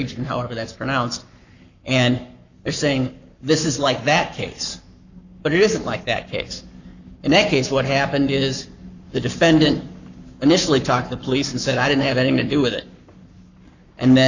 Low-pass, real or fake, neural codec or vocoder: 7.2 kHz; fake; codec, 44.1 kHz, 7.8 kbps, Pupu-Codec